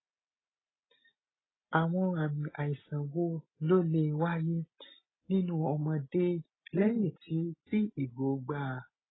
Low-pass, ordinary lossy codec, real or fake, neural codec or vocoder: 7.2 kHz; AAC, 16 kbps; real; none